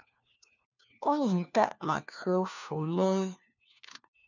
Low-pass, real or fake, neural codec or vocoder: 7.2 kHz; fake; codec, 16 kHz, 1 kbps, FunCodec, trained on LibriTTS, 50 frames a second